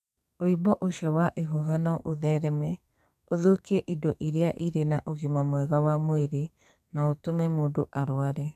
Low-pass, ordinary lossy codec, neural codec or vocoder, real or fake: 14.4 kHz; AAC, 96 kbps; codec, 44.1 kHz, 2.6 kbps, SNAC; fake